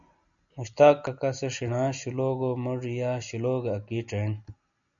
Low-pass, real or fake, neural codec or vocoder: 7.2 kHz; real; none